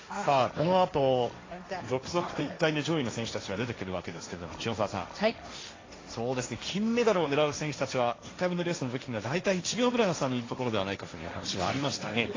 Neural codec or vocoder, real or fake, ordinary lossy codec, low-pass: codec, 16 kHz, 1.1 kbps, Voila-Tokenizer; fake; AAC, 32 kbps; 7.2 kHz